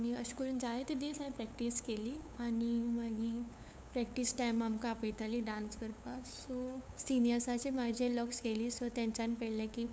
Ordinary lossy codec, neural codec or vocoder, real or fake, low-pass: none; codec, 16 kHz, 8 kbps, FunCodec, trained on LibriTTS, 25 frames a second; fake; none